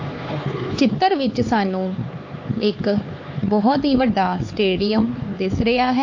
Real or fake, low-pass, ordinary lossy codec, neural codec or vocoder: fake; 7.2 kHz; AAC, 48 kbps; codec, 16 kHz, 4 kbps, X-Codec, HuBERT features, trained on LibriSpeech